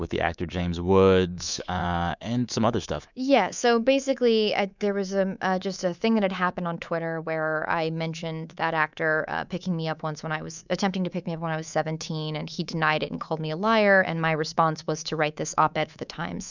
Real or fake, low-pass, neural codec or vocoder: fake; 7.2 kHz; codec, 24 kHz, 3.1 kbps, DualCodec